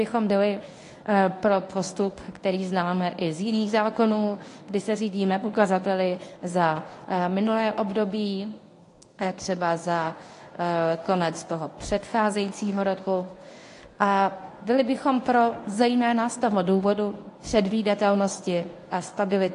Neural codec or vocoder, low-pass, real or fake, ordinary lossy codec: codec, 24 kHz, 0.9 kbps, WavTokenizer, medium speech release version 1; 10.8 kHz; fake; AAC, 48 kbps